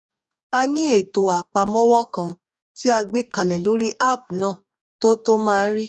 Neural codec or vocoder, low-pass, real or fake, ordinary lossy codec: codec, 44.1 kHz, 2.6 kbps, DAC; 10.8 kHz; fake; none